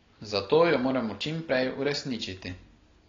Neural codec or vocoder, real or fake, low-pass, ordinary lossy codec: none; real; 7.2 kHz; AAC, 32 kbps